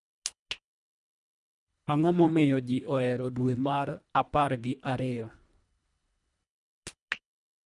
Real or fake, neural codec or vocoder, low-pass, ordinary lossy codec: fake; codec, 24 kHz, 1.5 kbps, HILCodec; 10.8 kHz; MP3, 96 kbps